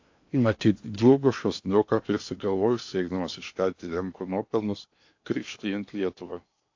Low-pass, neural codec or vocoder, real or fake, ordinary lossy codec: 7.2 kHz; codec, 16 kHz in and 24 kHz out, 0.8 kbps, FocalCodec, streaming, 65536 codes; fake; AAC, 48 kbps